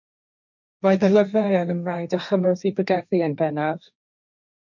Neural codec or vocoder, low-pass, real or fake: codec, 16 kHz, 1.1 kbps, Voila-Tokenizer; 7.2 kHz; fake